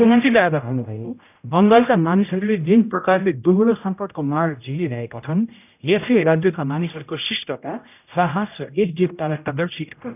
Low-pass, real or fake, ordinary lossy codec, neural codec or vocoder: 3.6 kHz; fake; none; codec, 16 kHz, 0.5 kbps, X-Codec, HuBERT features, trained on general audio